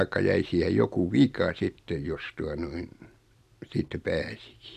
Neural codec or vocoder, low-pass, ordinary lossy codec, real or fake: none; 14.4 kHz; MP3, 96 kbps; real